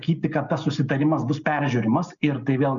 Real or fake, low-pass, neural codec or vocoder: real; 7.2 kHz; none